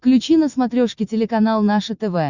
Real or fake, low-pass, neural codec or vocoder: real; 7.2 kHz; none